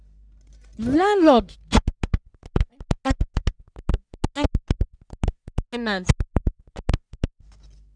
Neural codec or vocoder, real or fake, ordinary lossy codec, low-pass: codec, 44.1 kHz, 1.7 kbps, Pupu-Codec; fake; none; 9.9 kHz